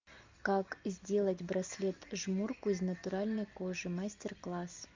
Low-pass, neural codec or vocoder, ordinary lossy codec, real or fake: 7.2 kHz; none; MP3, 64 kbps; real